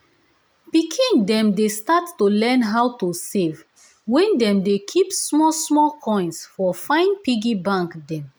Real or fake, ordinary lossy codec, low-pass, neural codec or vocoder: real; none; none; none